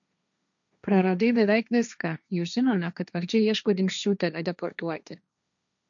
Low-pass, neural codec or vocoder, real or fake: 7.2 kHz; codec, 16 kHz, 1.1 kbps, Voila-Tokenizer; fake